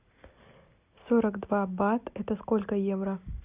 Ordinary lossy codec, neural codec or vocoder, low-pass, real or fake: Opus, 64 kbps; none; 3.6 kHz; real